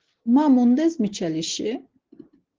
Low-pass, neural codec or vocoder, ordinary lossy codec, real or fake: 7.2 kHz; none; Opus, 16 kbps; real